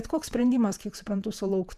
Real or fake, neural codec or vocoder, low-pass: fake; vocoder, 48 kHz, 128 mel bands, Vocos; 14.4 kHz